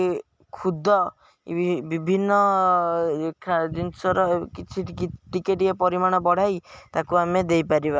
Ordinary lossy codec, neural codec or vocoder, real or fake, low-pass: none; none; real; none